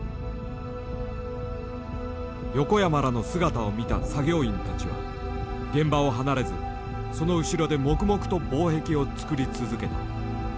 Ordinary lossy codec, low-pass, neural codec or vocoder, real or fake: none; none; none; real